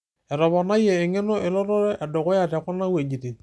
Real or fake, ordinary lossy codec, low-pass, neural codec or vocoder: real; none; none; none